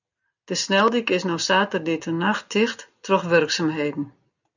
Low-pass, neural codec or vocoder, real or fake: 7.2 kHz; none; real